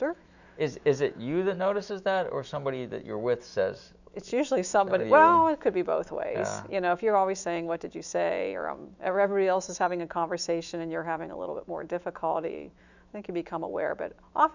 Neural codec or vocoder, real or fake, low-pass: autoencoder, 48 kHz, 128 numbers a frame, DAC-VAE, trained on Japanese speech; fake; 7.2 kHz